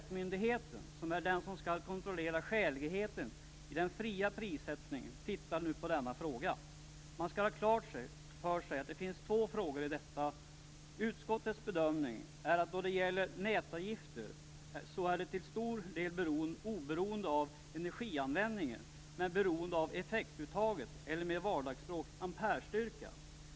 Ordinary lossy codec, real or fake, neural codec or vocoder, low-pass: none; real; none; none